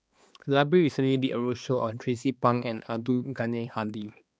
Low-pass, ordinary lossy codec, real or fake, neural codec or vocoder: none; none; fake; codec, 16 kHz, 2 kbps, X-Codec, HuBERT features, trained on balanced general audio